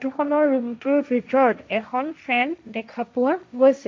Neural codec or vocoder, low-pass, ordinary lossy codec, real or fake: codec, 16 kHz, 1.1 kbps, Voila-Tokenizer; none; none; fake